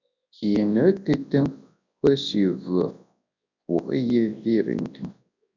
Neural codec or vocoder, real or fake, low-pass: codec, 24 kHz, 0.9 kbps, WavTokenizer, large speech release; fake; 7.2 kHz